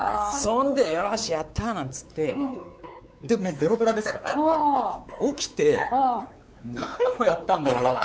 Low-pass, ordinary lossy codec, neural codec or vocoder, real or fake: none; none; codec, 16 kHz, 4 kbps, X-Codec, WavLM features, trained on Multilingual LibriSpeech; fake